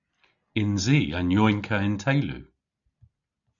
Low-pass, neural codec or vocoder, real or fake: 7.2 kHz; none; real